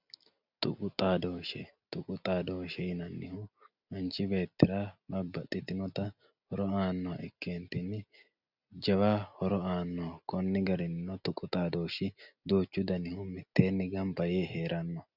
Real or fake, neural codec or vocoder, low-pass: real; none; 5.4 kHz